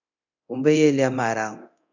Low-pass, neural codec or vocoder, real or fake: 7.2 kHz; codec, 24 kHz, 0.9 kbps, DualCodec; fake